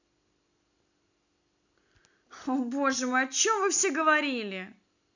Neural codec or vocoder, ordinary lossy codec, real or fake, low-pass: none; none; real; 7.2 kHz